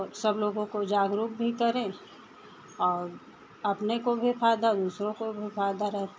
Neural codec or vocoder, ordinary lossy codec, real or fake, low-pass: none; none; real; none